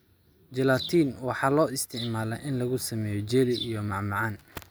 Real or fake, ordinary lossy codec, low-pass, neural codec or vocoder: real; none; none; none